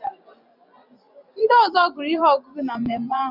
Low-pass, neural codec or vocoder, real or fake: 5.4 kHz; vocoder, 24 kHz, 100 mel bands, Vocos; fake